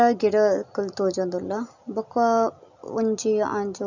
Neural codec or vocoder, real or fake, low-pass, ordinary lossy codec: none; real; 7.2 kHz; none